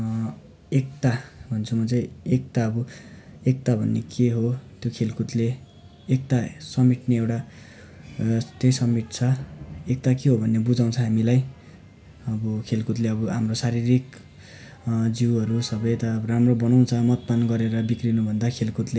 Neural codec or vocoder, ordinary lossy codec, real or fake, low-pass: none; none; real; none